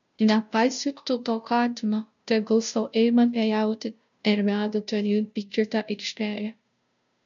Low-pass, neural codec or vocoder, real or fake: 7.2 kHz; codec, 16 kHz, 0.5 kbps, FunCodec, trained on Chinese and English, 25 frames a second; fake